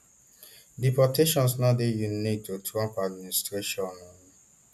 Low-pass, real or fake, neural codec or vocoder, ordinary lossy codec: 14.4 kHz; real; none; none